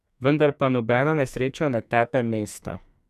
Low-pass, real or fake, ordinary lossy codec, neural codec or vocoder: 14.4 kHz; fake; none; codec, 44.1 kHz, 2.6 kbps, SNAC